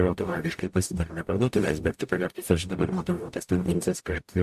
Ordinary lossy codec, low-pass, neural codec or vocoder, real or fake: AAC, 96 kbps; 14.4 kHz; codec, 44.1 kHz, 0.9 kbps, DAC; fake